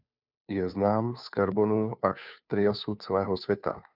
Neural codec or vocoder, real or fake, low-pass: codec, 16 kHz, 2 kbps, FunCodec, trained on Chinese and English, 25 frames a second; fake; 5.4 kHz